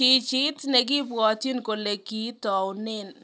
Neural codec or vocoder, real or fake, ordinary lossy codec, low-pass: none; real; none; none